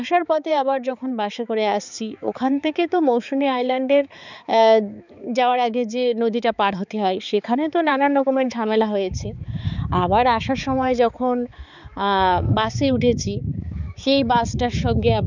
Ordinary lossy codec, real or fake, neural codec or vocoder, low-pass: none; fake; codec, 16 kHz, 4 kbps, X-Codec, HuBERT features, trained on balanced general audio; 7.2 kHz